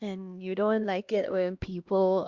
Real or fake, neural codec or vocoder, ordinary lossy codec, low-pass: fake; codec, 16 kHz, 1 kbps, X-Codec, HuBERT features, trained on LibriSpeech; Opus, 64 kbps; 7.2 kHz